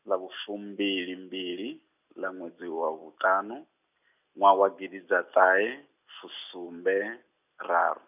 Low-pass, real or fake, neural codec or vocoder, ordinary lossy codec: 3.6 kHz; real; none; none